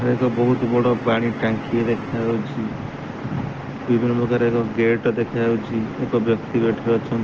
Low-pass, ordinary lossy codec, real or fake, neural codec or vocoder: 7.2 kHz; Opus, 16 kbps; real; none